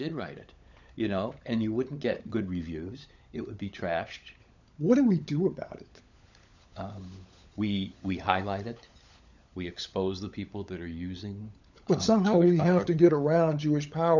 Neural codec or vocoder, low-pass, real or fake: codec, 16 kHz, 16 kbps, FunCodec, trained on LibriTTS, 50 frames a second; 7.2 kHz; fake